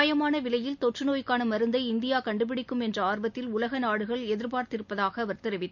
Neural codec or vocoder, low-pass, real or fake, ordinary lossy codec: none; 7.2 kHz; real; none